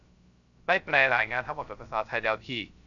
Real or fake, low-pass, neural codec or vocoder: fake; 7.2 kHz; codec, 16 kHz, 0.3 kbps, FocalCodec